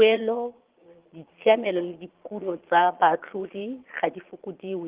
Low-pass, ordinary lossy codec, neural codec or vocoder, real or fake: 3.6 kHz; Opus, 16 kbps; vocoder, 22.05 kHz, 80 mel bands, Vocos; fake